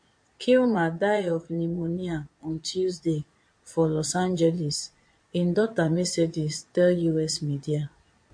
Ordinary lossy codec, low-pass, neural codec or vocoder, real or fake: MP3, 48 kbps; 9.9 kHz; vocoder, 22.05 kHz, 80 mel bands, Vocos; fake